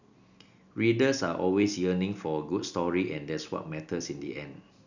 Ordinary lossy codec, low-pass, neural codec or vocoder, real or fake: none; 7.2 kHz; none; real